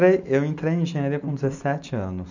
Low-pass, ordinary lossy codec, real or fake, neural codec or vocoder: 7.2 kHz; none; real; none